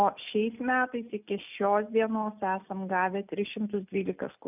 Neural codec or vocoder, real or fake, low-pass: none; real; 3.6 kHz